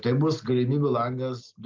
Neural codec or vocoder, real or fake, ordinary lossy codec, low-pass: none; real; Opus, 32 kbps; 7.2 kHz